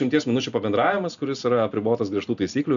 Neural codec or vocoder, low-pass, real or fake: none; 7.2 kHz; real